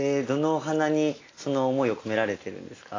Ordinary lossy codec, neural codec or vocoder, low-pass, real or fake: AAC, 32 kbps; none; 7.2 kHz; real